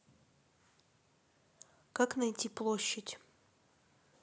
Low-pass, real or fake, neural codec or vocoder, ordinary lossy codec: none; real; none; none